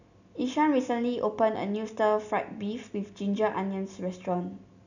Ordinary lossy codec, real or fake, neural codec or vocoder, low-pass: none; real; none; 7.2 kHz